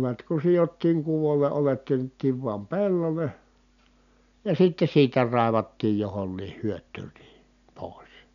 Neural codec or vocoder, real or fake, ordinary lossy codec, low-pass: none; real; none; 7.2 kHz